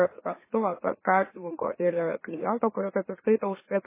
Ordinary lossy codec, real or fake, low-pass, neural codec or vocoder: MP3, 16 kbps; fake; 3.6 kHz; autoencoder, 44.1 kHz, a latent of 192 numbers a frame, MeloTTS